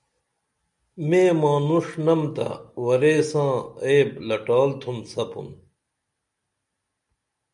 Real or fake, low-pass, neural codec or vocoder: real; 10.8 kHz; none